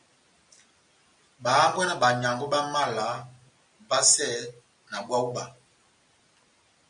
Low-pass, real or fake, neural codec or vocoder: 9.9 kHz; real; none